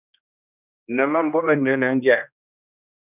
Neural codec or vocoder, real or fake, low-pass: codec, 16 kHz, 1 kbps, X-Codec, HuBERT features, trained on general audio; fake; 3.6 kHz